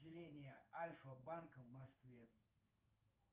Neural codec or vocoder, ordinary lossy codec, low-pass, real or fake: codec, 16 kHz in and 24 kHz out, 1 kbps, XY-Tokenizer; Opus, 24 kbps; 3.6 kHz; fake